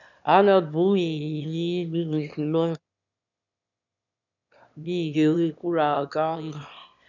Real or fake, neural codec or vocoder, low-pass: fake; autoencoder, 22.05 kHz, a latent of 192 numbers a frame, VITS, trained on one speaker; 7.2 kHz